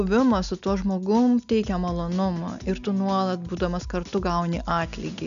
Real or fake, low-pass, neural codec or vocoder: real; 7.2 kHz; none